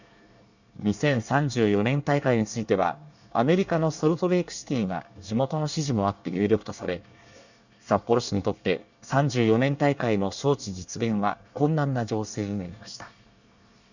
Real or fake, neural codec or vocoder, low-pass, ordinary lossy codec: fake; codec, 24 kHz, 1 kbps, SNAC; 7.2 kHz; none